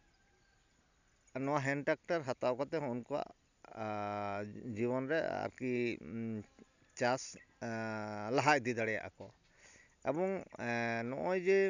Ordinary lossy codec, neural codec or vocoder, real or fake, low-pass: none; none; real; 7.2 kHz